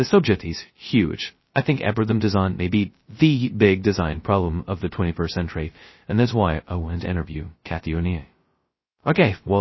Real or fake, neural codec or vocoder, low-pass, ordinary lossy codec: fake; codec, 16 kHz, 0.2 kbps, FocalCodec; 7.2 kHz; MP3, 24 kbps